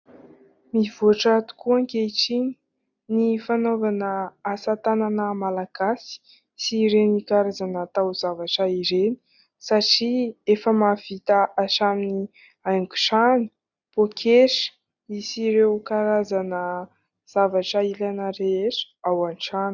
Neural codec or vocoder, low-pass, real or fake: none; 7.2 kHz; real